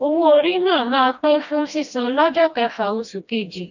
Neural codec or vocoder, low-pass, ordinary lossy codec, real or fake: codec, 16 kHz, 1 kbps, FreqCodec, smaller model; 7.2 kHz; none; fake